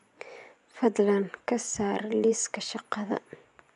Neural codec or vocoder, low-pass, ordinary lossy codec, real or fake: vocoder, 24 kHz, 100 mel bands, Vocos; 10.8 kHz; none; fake